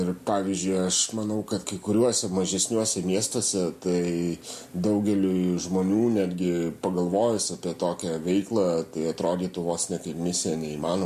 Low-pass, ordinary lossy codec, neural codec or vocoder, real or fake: 14.4 kHz; MP3, 64 kbps; none; real